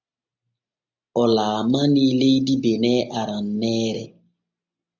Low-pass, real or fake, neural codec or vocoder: 7.2 kHz; real; none